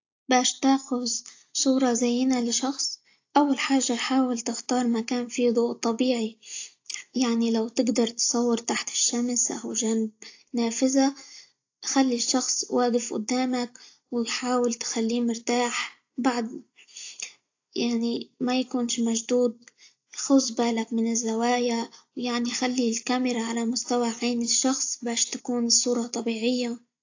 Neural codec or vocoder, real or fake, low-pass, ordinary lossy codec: none; real; 7.2 kHz; AAC, 48 kbps